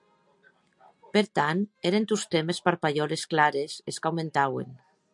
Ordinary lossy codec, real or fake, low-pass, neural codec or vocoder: MP3, 96 kbps; real; 10.8 kHz; none